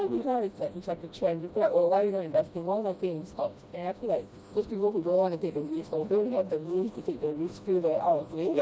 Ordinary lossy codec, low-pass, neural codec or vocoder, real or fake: none; none; codec, 16 kHz, 1 kbps, FreqCodec, smaller model; fake